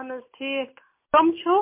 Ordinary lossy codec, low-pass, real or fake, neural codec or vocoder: MP3, 24 kbps; 3.6 kHz; real; none